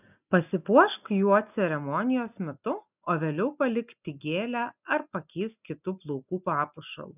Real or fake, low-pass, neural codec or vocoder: real; 3.6 kHz; none